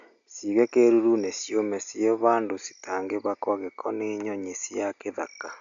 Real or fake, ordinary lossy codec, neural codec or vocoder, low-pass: real; MP3, 96 kbps; none; 7.2 kHz